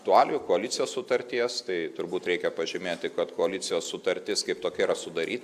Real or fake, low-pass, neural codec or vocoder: real; 14.4 kHz; none